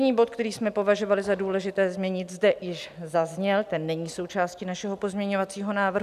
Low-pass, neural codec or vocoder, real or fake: 14.4 kHz; none; real